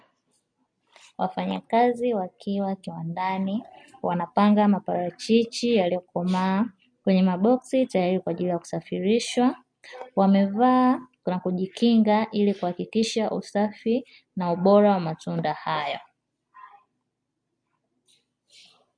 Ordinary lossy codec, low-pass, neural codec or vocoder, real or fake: MP3, 48 kbps; 9.9 kHz; none; real